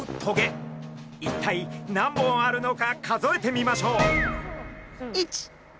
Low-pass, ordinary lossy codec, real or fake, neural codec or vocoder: none; none; real; none